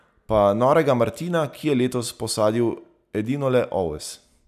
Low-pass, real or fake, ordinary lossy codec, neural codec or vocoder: 14.4 kHz; real; none; none